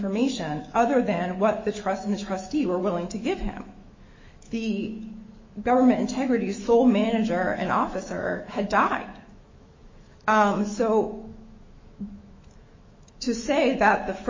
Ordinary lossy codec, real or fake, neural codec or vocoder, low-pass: MP3, 32 kbps; fake; autoencoder, 48 kHz, 128 numbers a frame, DAC-VAE, trained on Japanese speech; 7.2 kHz